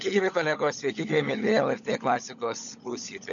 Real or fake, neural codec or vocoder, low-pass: fake; codec, 16 kHz, 16 kbps, FunCodec, trained on LibriTTS, 50 frames a second; 7.2 kHz